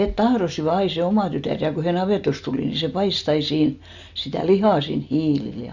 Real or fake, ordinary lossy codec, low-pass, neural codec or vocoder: real; none; 7.2 kHz; none